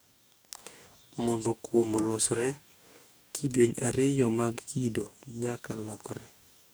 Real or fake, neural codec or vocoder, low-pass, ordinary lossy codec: fake; codec, 44.1 kHz, 2.6 kbps, DAC; none; none